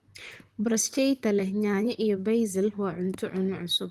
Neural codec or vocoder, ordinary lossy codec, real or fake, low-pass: vocoder, 44.1 kHz, 128 mel bands, Pupu-Vocoder; Opus, 24 kbps; fake; 14.4 kHz